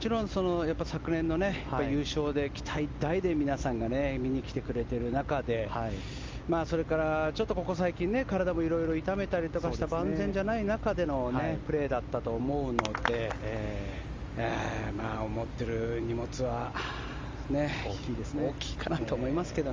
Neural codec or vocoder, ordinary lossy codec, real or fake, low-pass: none; Opus, 32 kbps; real; 7.2 kHz